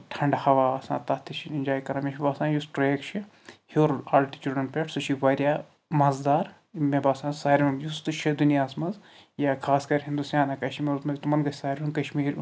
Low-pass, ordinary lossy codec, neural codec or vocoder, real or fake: none; none; none; real